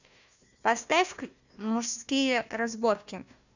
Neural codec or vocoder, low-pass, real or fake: codec, 16 kHz, 1 kbps, FunCodec, trained on Chinese and English, 50 frames a second; 7.2 kHz; fake